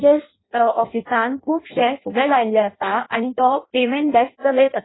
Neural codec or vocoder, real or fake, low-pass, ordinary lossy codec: codec, 16 kHz in and 24 kHz out, 0.6 kbps, FireRedTTS-2 codec; fake; 7.2 kHz; AAC, 16 kbps